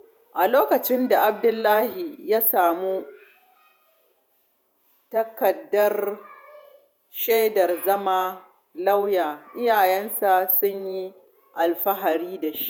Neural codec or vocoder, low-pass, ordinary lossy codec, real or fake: vocoder, 48 kHz, 128 mel bands, Vocos; none; none; fake